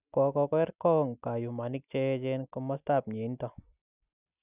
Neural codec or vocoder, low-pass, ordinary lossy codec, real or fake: none; 3.6 kHz; none; real